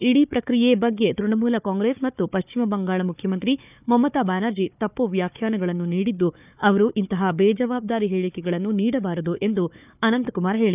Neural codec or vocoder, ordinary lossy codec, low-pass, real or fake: codec, 16 kHz, 4 kbps, FunCodec, trained on Chinese and English, 50 frames a second; none; 3.6 kHz; fake